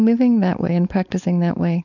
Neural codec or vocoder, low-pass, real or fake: codec, 16 kHz, 4.8 kbps, FACodec; 7.2 kHz; fake